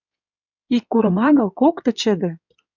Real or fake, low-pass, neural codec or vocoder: fake; 7.2 kHz; codec, 16 kHz in and 24 kHz out, 2.2 kbps, FireRedTTS-2 codec